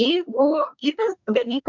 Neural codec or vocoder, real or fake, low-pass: codec, 24 kHz, 1 kbps, SNAC; fake; 7.2 kHz